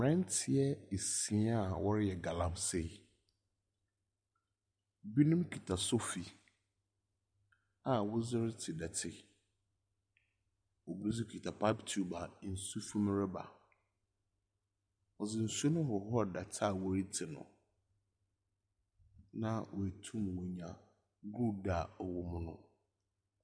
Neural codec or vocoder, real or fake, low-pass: none; real; 9.9 kHz